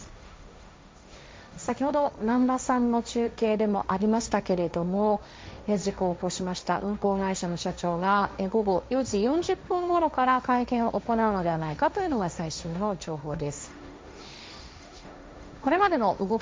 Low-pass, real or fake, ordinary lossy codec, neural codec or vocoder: none; fake; none; codec, 16 kHz, 1.1 kbps, Voila-Tokenizer